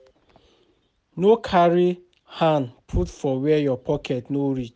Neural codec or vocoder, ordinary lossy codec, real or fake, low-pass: none; none; real; none